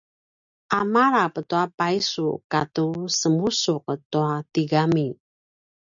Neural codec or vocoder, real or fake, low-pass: none; real; 7.2 kHz